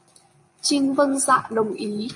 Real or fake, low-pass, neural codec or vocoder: fake; 10.8 kHz; vocoder, 24 kHz, 100 mel bands, Vocos